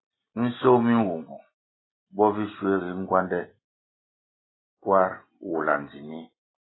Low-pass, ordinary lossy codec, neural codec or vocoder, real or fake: 7.2 kHz; AAC, 16 kbps; none; real